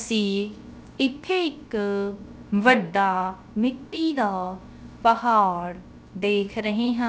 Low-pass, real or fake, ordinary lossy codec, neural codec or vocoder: none; fake; none; codec, 16 kHz, 0.3 kbps, FocalCodec